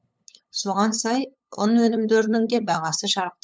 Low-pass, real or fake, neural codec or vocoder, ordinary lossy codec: none; fake; codec, 16 kHz, 8 kbps, FunCodec, trained on LibriTTS, 25 frames a second; none